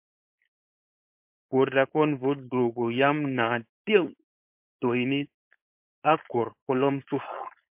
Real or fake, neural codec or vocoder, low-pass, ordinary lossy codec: fake; codec, 16 kHz, 4.8 kbps, FACodec; 3.6 kHz; MP3, 32 kbps